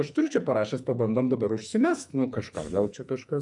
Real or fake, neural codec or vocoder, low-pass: fake; codec, 44.1 kHz, 2.6 kbps, SNAC; 10.8 kHz